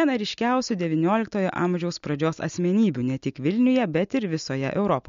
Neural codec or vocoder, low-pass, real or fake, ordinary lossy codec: none; 7.2 kHz; real; MP3, 48 kbps